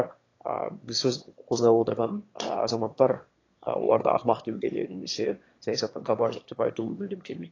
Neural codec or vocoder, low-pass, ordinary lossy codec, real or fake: autoencoder, 22.05 kHz, a latent of 192 numbers a frame, VITS, trained on one speaker; 7.2 kHz; AAC, 32 kbps; fake